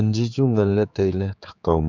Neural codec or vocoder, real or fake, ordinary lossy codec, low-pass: codec, 16 kHz, 4 kbps, X-Codec, HuBERT features, trained on general audio; fake; none; 7.2 kHz